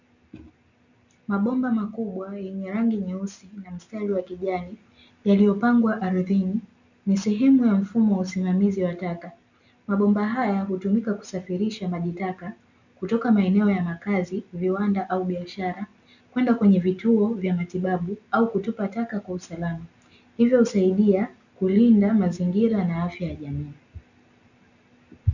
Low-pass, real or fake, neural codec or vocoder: 7.2 kHz; real; none